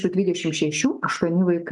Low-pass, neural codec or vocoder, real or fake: 10.8 kHz; none; real